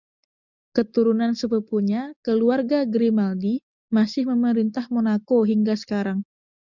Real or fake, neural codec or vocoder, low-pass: real; none; 7.2 kHz